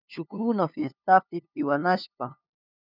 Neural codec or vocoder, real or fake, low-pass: codec, 16 kHz, 2 kbps, FunCodec, trained on LibriTTS, 25 frames a second; fake; 5.4 kHz